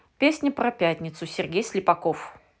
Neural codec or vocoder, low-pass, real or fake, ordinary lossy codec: none; none; real; none